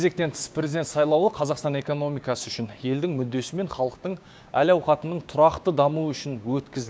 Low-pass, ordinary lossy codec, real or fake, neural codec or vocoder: none; none; fake; codec, 16 kHz, 6 kbps, DAC